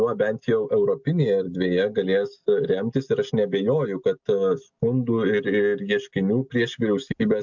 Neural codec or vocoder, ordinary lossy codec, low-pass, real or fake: none; MP3, 64 kbps; 7.2 kHz; real